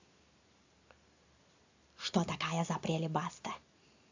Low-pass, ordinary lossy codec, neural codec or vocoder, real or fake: 7.2 kHz; AAC, 48 kbps; none; real